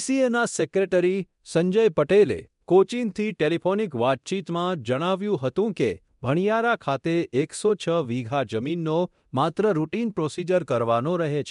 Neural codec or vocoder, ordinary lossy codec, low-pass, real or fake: codec, 24 kHz, 0.9 kbps, DualCodec; MP3, 64 kbps; 10.8 kHz; fake